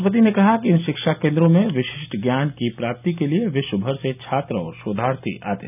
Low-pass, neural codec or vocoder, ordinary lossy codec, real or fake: 3.6 kHz; none; none; real